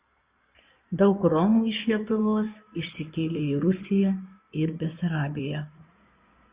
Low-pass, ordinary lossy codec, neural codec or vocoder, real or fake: 3.6 kHz; Opus, 64 kbps; codec, 16 kHz in and 24 kHz out, 2.2 kbps, FireRedTTS-2 codec; fake